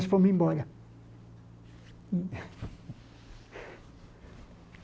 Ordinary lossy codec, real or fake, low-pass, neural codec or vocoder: none; real; none; none